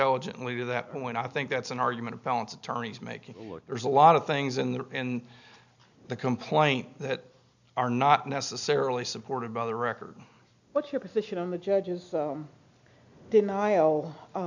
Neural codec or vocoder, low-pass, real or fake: none; 7.2 kHz; real